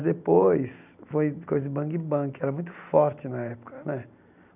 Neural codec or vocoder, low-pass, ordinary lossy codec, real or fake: none; 3.6 kHz; none; real